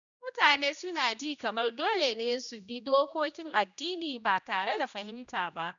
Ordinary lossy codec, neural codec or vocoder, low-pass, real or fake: none; codec, 16 kHz, 1 kbps, X-Codec, HuBERT features, trained on general audio; 7.2 kHz; fake